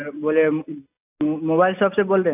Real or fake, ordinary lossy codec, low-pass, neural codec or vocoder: real; AAC, 32 kbps; 3.6 kHz; none